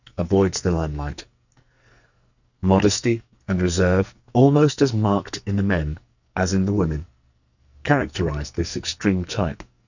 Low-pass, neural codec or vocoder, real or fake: 7.2 kHz; codec, 44.1 kHz, 2.6 kbps, SNAC; fake